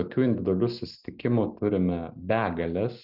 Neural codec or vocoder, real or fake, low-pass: none; real; 5.4 kHz